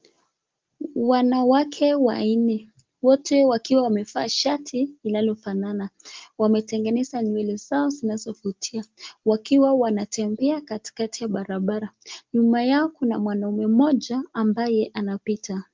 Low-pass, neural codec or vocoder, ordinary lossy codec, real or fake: 7.2 kHz; none; Opus, 32 kbps; real